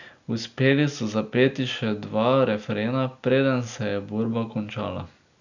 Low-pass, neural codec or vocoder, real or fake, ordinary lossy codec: 7.2 kHz; none; real; none